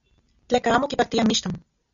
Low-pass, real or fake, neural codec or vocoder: 7.2 kHz; real; none